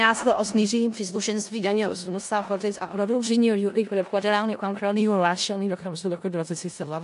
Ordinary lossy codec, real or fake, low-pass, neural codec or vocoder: AAC, 64 kbps; fake; 10.8 kHz; codec, 16 kHz in and 24 kHz out, 0.4 kbps, LongCat-Audio-Codec, four codebook decoder